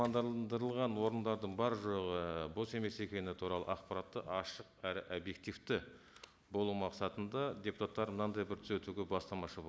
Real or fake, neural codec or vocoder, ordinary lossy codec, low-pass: real; none; none; none